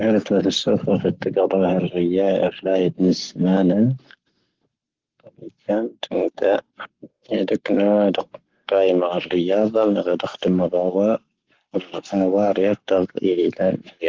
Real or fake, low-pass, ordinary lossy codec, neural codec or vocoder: fake; 7.2 kHz; Opus, 16 kbps; codec, 44.1 kHz, 7.8 kbps, Pupu-Codec